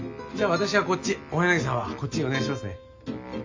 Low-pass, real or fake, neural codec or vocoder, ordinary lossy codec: 7.2 kHz; real; none; MP3, 48 kbps